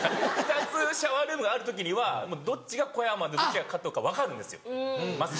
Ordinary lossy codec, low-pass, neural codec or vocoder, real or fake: none; none; none; real